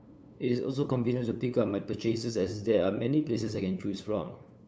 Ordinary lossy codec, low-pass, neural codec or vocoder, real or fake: none; none; codec, 16 kHz, 8 kbps, FunCodec, trained on LibriTTS, 25 frames a second; fake